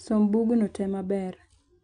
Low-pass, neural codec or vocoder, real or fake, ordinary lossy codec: 9.9 kHz; none; real; none